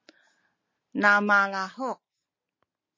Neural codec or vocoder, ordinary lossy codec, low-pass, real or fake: none; MP3, 32 kbps; 7.2 kHz; real